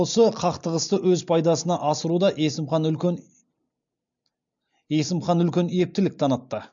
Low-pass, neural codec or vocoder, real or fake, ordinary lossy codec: 7.2 kHz; none; real; MP3, 64 kbps